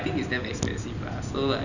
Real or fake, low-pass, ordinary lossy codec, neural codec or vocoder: real; 7.2 kHz; none; none